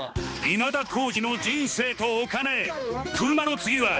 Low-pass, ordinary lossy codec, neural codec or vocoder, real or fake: none; none; codec, 16 kHz, 2 kbps, X-Codec, HuBERT features, trained on balanced general audio; fake